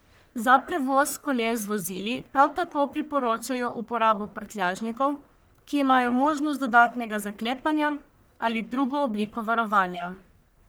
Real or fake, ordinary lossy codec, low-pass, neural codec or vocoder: fake; none; none; codec, 44.1 kHz, 1.7 kbps, Pupu-Codec